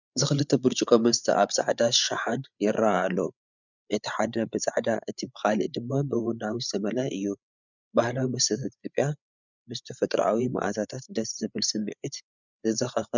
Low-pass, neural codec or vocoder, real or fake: 7.2 kHz; codec, 16 kHz, 8 kbps, FreqCodec, larger model; fake